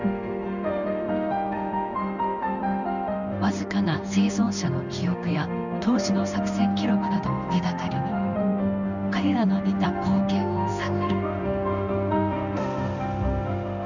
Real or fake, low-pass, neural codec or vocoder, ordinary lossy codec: fake; 7.2 kHz; codec, 16 kHz in and 24 kHz out, 1 kbps, XY-Tokenizer; none